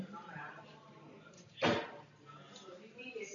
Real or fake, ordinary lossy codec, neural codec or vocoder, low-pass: real; MP3, 96 kbps; none; 7.2 kHz